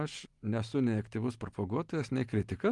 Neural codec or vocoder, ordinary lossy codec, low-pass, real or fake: none; Opus, 24 kbps; 10.8 kHz; real